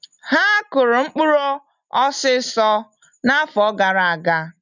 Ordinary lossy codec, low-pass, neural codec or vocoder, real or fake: none; 7.2 kHz; none; real